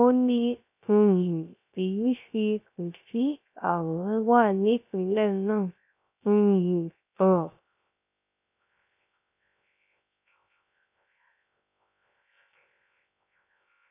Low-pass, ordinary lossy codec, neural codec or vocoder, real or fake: 3.6 kHz; none; codec, 16 kHz, 0.3 kbps, FocalCodec; fake